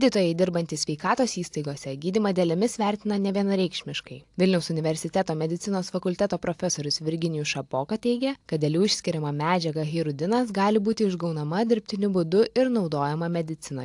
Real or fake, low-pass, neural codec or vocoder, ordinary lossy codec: real; 10.8 kHz; none; AAC, 64 kbps